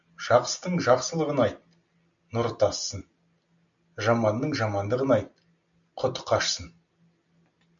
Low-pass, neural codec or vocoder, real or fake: 7.2 kHz; none; real